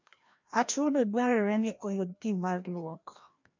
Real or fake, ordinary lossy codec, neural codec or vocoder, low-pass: fake; MP3, 48 kbps; codec, 16 kHz, 1 kbps, FreqCodec, larger model; 7.2 kHz